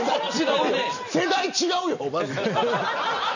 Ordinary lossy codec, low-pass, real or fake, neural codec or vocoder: none; 7.2 kHz; real; none